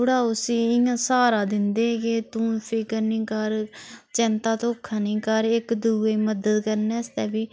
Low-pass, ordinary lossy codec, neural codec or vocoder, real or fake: none; none; none; real